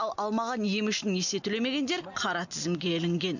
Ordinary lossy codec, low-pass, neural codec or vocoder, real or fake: none; 7.2 kHz; none; real